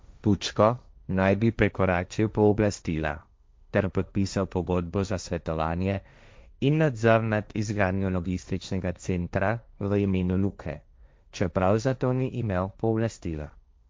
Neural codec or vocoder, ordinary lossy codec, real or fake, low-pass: codec, 16 kHz, 1.1 kbps, Voila-Tokenizer; none; fake; none